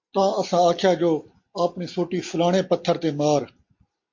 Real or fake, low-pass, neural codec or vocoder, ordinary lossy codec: real; 7.2 kHz; none; MP3, 48 kbps